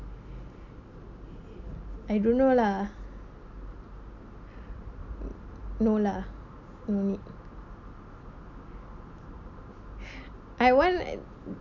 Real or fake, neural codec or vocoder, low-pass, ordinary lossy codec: real; none; 7.2 kHz; none